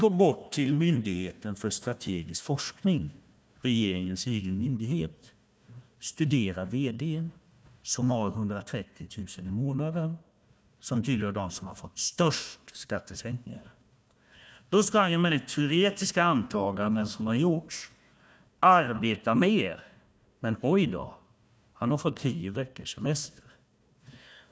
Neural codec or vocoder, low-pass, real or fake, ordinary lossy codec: codec, 16 kHz, 1 kbps, FunCodec, trained on Chinese and English, 50 frames a second; none; fake; none